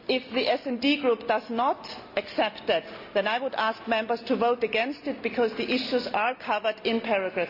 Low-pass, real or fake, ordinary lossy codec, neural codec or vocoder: 5.4 kHz; real; none; none